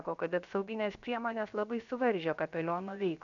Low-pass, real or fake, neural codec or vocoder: 7.2 kHz; fake; codec, 16 kHz, about 1 kbps, DyCAST, with the encoder's durations